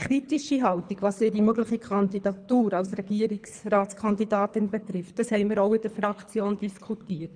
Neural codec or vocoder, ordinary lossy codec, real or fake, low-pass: codec, 24 kHz, 3 kbps, HILCodec; none; fake; 9.9 kHz